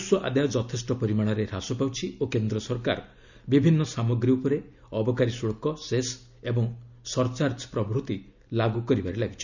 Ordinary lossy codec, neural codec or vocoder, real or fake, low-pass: none; none; real; 7.2 kHz